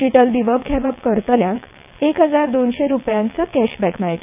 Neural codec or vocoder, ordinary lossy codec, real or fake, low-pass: vocoder, 22.05 kHz, 80 mel bands, WaveNeXt; none; fake; 3.6 kHz